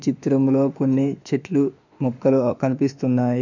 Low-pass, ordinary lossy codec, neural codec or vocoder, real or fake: 7.2 kHz; none; autoencoder, 48 kHz, 32 numbers a frame, DAC-VAE, trained on Japanese speech; fake